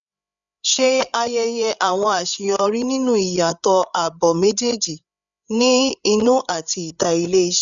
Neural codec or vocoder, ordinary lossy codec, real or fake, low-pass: codec, 16 kHz, 8 kbps, FreqCodec, larger model; none; fake; 7.2 kHz